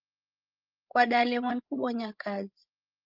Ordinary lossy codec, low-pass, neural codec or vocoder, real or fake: Opus, 24 kbps; 5.4 kHz; codec, 16 kHz, 8 kbps, FreqCodec, larger model; fake